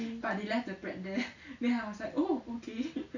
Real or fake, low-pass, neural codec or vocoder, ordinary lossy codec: real; 7.2 kHz; none; none